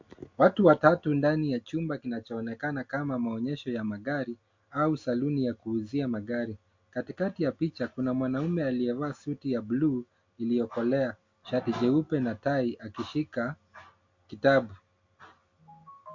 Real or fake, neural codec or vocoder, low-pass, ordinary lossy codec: real; none; 7.2 kHz; MP3, 48 kbps